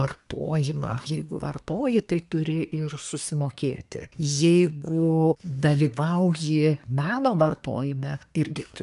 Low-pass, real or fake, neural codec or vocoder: 10.8 kHz; fake; codec, 24 kHz, 1 kbps, SNAC